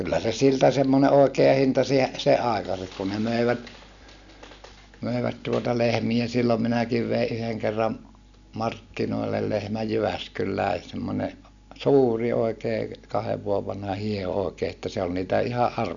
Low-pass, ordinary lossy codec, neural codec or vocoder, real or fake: 7.2 kHz; none; none; real